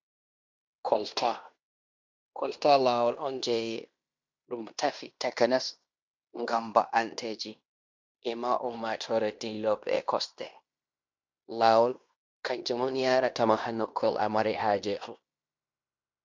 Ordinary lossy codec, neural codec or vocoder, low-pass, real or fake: MP3, 64 kbps; codec, 16 kHz in and 24 kHz out, 0.9 kbps, LongCat-Audio-Codec, fine tuned four codebook decoder; 7.2 kHz; fake